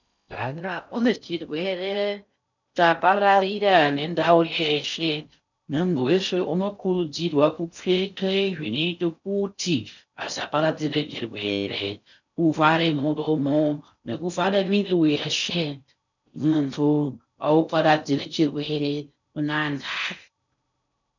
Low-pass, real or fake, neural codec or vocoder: 7.2 kHz; fake; codec, 16 kHz in and 24 kHz out, 0.6 kbps, FocalCodec, streaming, 4096 codes